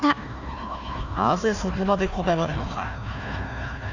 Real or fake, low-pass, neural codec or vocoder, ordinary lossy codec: fake; 7.2 kHz; codec, 16 kHz, 1 kbps, FunCodec, trained on Chinese and English, 50 frames a second; none